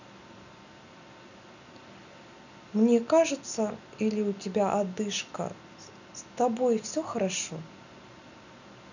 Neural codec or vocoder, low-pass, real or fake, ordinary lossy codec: none; 7.2 kHz; real; none